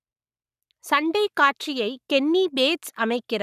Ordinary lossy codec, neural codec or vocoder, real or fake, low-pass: none; codec, 44.1 kHz, 7.8 kbps, Pupu-Codec; fake; 14.4 kHz